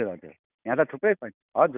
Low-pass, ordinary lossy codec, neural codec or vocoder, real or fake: 3.6 kHz; none; none; real